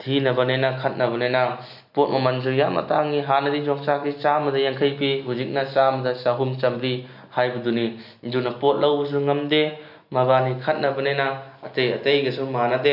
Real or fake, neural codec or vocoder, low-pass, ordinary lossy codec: real; none; 5.4 kHz; none